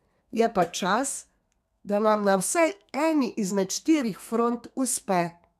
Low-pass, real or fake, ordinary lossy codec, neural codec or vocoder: 14.4 kHz; fake; none; codec, 32 kHz, 1.9 kbps, SNAC